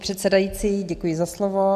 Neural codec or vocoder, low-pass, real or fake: none; 14.4 kHz; real